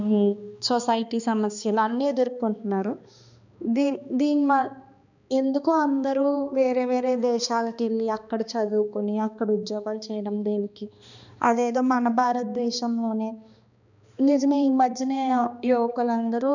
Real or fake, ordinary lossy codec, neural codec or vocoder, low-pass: fake; none; codec, 16 kHz, 2 kbps, X-Codec, HuBERT features, trained on balanced general audio; 7.2 kHz